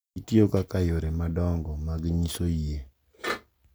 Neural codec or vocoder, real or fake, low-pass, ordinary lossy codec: none; real; none; none